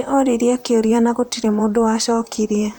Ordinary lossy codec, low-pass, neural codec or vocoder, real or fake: none; none; vocoder, 44.1 kHz, 128 mel bands, Pupu-Vocoder; fake